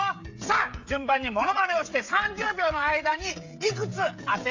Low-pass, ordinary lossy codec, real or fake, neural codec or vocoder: 7.2 kHz; AAC, 48 kbps; fake; codec, 16 kHz, 16 kbps, FreqCodec, smaller model